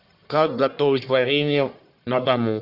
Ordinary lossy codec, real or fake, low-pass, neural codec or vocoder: Opus, 64 kbps; fake; 5.4 kHz; codec, 44.1 kHz, 1.7 kbps, Pupu-Codec